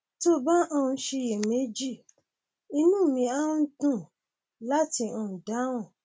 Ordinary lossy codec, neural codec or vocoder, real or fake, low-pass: none; none; real; none